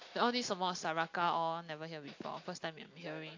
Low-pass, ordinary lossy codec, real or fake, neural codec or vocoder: 7.2 kHz; AAC, 48 kbps; real; none